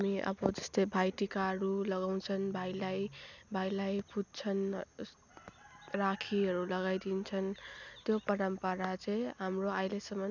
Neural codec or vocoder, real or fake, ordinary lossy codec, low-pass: none; real; none; 7.2 kHz